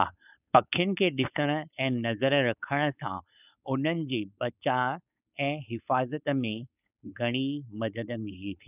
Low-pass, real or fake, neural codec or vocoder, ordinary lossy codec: 3.6 kHz; fake; codec, 16 kHz, 4.8 kbps, FACodec; none